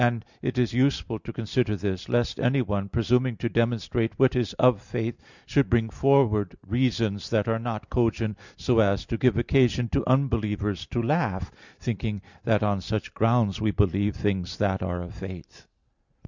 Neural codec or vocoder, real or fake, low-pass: none; real; 7.2 kHz